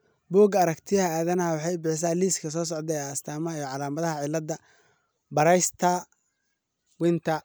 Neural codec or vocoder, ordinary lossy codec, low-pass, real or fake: none; none; none; real